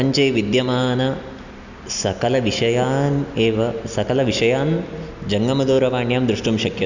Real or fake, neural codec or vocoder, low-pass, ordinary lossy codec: real; none; 7.2 kHz; none